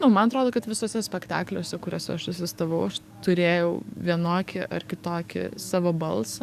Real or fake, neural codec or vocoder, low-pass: fake; codec, 44.1 kHz, 7.8 kbps, DAC; 14.4 kHz